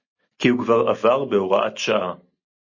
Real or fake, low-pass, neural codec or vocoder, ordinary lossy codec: real; 7.2 kHz; none; MP3, 32 kbps